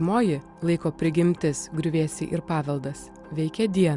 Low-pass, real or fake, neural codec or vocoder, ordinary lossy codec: 10.8 kHz; real; none; Opus, 64 kbps